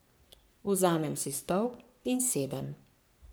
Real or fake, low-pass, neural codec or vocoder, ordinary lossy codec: fake; none; codec, 44.1 kHz, 3.4 kbps, Pupu-Codec; none